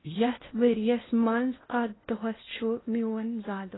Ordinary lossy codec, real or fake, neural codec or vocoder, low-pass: AAC, 16 kbps; fake; codec, 16 kHz in and 24 kHz out, 0.8 kbps, FocalCodec, streaming, 65536 codes; 7.2 kHz